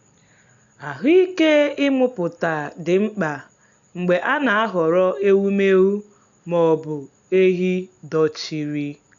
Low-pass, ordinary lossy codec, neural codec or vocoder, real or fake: 7.2 kHz; none; none; real